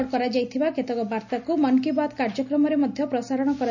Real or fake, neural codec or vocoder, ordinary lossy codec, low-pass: real; none; none; 7.2 kHz